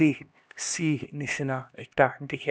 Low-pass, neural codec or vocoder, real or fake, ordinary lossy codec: none; codec, 16 kHz, 1 kbps, X-Codec, HuBERT features, trained on LibriSpeech; fake; none